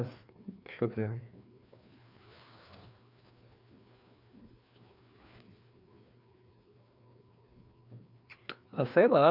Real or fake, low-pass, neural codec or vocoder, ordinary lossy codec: fake; 5.4 kHz; codec, 16 kHz, 2 kbps, FreqCodec, larger model; none